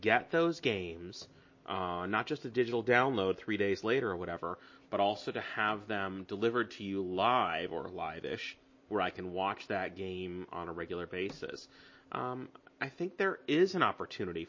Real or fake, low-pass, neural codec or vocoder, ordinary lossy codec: real; 7.2 kHz; none; MP3, 32 kbps